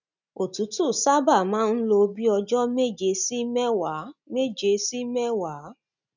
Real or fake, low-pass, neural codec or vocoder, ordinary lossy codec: real; 7.2 kHz; none; none